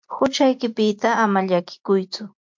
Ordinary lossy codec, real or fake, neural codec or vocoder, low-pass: MP3, 48 kbps; real; none; 7.2 kHz